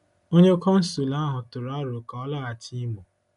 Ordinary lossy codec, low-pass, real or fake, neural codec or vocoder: none; 10.8 kHz; real; none